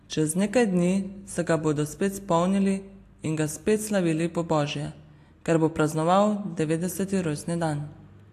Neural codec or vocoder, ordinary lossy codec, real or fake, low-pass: none; AAC, 64 kbps; real; 14.4 kHz